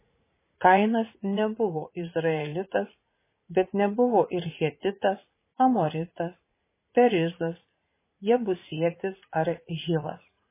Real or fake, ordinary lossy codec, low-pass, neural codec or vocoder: fake; MP3, 16 kbps; 3.6 kHz; vocoder, 44.1 kHz, 80 mel bands, Vocos